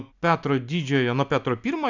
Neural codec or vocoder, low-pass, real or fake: none; 7.2 kHz; real